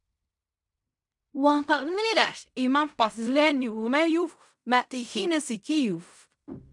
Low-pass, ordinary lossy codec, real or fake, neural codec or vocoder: 10.8 kHz; MP3, 96 kbps; fake; codec, 16 kHz in and 24 kHz out, 0.4 kbps, LongCat-Audio-Codec, fine tuned four codebook decoder